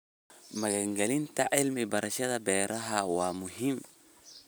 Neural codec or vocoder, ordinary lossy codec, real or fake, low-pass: none; none; real; none